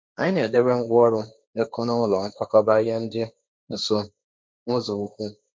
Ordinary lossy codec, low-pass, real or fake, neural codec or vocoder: none; none; fake; codec, 16 kHz, 1.1 kbps, Voila-Tokenizer